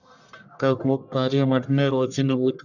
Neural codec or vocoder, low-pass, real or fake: codec, 44.1 kHz, 1.7 kbps, Pupu-Codec; 7.2 kHz; fake